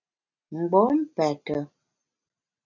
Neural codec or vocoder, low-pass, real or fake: none; 7.2 kHz; real